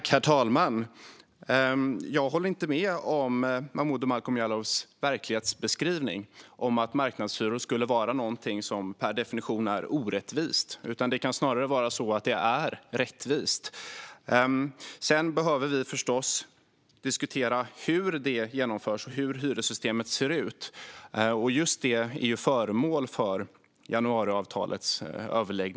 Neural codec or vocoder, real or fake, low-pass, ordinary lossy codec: none; real; none; none